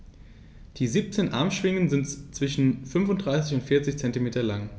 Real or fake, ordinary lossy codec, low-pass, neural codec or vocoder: real; none; none; none